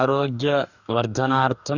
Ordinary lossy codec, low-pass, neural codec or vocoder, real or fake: none; 7.2 kHz; codec, 44.1 kHz, 2.6 kbps, DAC; fake